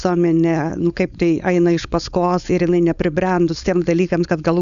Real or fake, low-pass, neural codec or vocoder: fake; 7.2 kHz; codec, 16 kHz, 4.8 kbps, FACodec